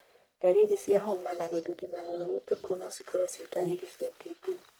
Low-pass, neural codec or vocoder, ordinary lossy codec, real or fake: none; codec, 44.1 kHz, 1.7 kbps, Pupu-Codec; none; fake